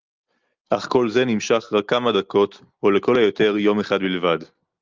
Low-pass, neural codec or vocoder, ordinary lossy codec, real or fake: 7.2 kHz; none; Opus, 24 kbps; real